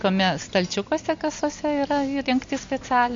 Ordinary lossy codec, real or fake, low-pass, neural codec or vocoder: MP3, 48 kbps; real; 7.2 kHz; none